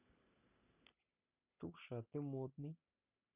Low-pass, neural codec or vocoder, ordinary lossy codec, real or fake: 3.6 kHz; none; none; real